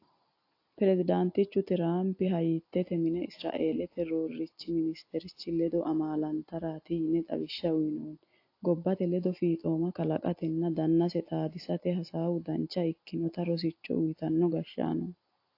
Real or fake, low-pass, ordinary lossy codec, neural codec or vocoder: real; 5.4 kHz; AAC, 32 kbps; none